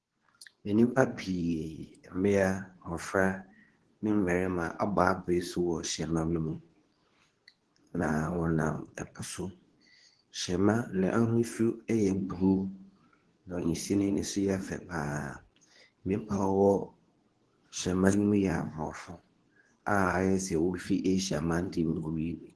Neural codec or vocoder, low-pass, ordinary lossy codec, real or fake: codec, 24 kHz, 0.9 kbps, WavTokenizer, medium speech release version 2; 10.8 kHz; Opus, 16 kbps; fake